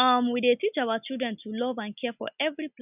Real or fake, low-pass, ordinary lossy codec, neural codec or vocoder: real; 3.6 kHz; none; none